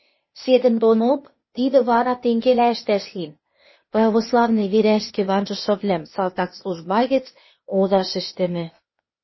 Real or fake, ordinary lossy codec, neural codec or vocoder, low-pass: fake; MP3, 24 kbps; codec, 16 kHz, 0.8 kbps, ZipCodec; 7.2 kHz